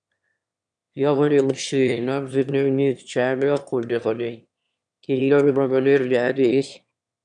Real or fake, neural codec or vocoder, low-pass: fake; autoencoder, 22.05 kHz, a latent of 192 numbers a frame, VITS, trained on one speaker; 9.9 kHz